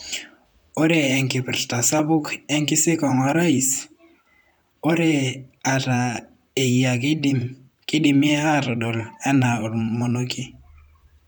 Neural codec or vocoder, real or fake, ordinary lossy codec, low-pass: vocoder, 44.1 kHz, 128 mel bands, Pupu-Vocoder; fake; none; none